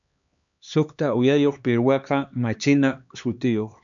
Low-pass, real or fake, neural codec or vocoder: 7.2 kHz; fake; codec, 16 kHz, 2 kbps, X-Codec, HuBERT features, trained on LibriSpeech